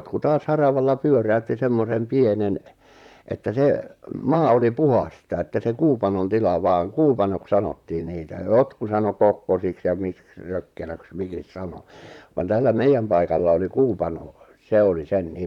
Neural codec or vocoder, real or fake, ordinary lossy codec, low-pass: vocoder, 44.1 kHz, 128 mel bands, Pupu-Vocoder; fake; none; 19.8 kHz